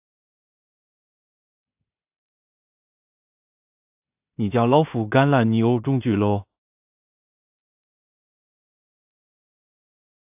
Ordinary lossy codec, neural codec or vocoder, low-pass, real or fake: AAC, 32 kbps; codec, 16 kHz in and 24 kHz out, 0.4 kbps, LongCat-Audio-Codec, two codebook decoder; 3.6 kHz; fake